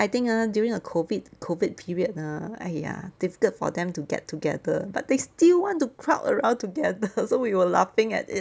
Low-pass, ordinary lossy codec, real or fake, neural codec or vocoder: none; none; real; none